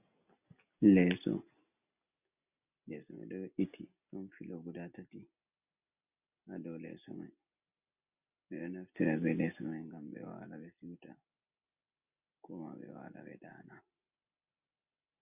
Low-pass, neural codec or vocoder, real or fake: 3.6 kHz; none; real